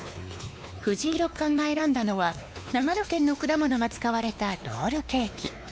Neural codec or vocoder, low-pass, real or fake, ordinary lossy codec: codec, 16 kHz, 2 kbps, X-Codec, WavLM features, trained on Multilingual LibriSpeech; none; fake; none